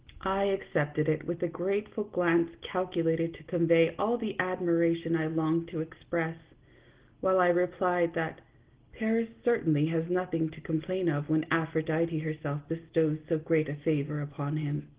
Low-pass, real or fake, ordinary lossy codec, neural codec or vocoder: 3.6 kHz; real; Opus, 16 kbps; none